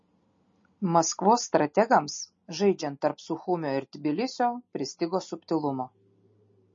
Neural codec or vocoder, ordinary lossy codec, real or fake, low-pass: none; MP3, 32 kbps; real; 7.2 kHz